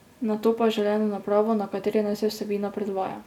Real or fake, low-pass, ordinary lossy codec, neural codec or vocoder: real; 19.8 kHz; none; none